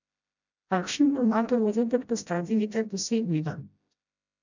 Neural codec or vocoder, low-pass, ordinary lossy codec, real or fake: codec, 16 kHz, 0.5 kbps, FreqCodec, smaller model; 7.2 kHz; none; fake